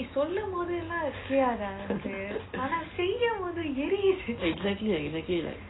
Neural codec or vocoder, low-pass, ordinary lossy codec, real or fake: none; 7.2 kHz; AAC, 16 kbps; real